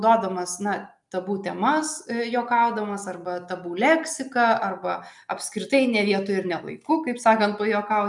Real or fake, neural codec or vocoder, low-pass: real; none; 10.8 kHz